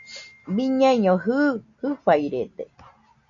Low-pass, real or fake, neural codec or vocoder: 7.2 kHz; real; none